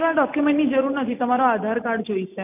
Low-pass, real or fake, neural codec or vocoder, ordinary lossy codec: 3.6 kHz; real; none; none